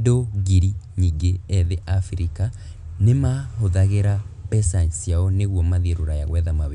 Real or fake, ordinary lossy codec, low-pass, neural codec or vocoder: real; none; 10.8 kHz; none